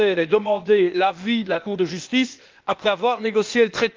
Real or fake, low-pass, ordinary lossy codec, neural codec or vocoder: fake; 7.2 kHz; Opus, 32 kbps; codec, 16 kHz, 0.8 kbps, ZipCodec